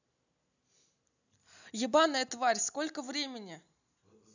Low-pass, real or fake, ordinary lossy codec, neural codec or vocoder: 7.2 kHz; real; none; none